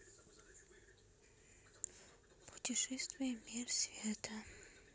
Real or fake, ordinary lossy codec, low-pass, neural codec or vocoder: real; none; none; none